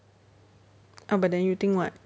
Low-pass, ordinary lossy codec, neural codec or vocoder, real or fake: none; none; none; real